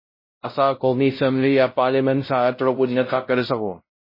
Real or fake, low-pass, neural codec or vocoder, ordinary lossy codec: fake; 5.4 kHz; codec, 16 kHz, 0.5 kbps, X-Codec, WavLM features, trained on Multilingual LibriSpeech; MP3, 24 kbps